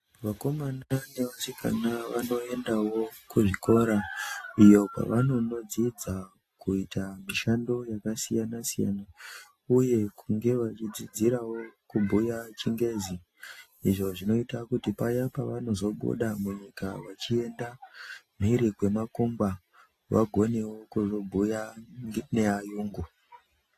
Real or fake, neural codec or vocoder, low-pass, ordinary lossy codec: real; none; 14.4 kHz; AAC, 48 kbps